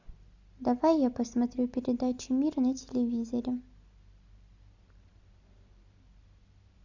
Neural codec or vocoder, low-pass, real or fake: none; 7.2 kHz; real